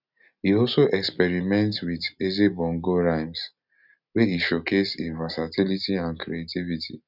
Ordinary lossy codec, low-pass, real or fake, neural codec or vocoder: none; 5.4 kHz; real; none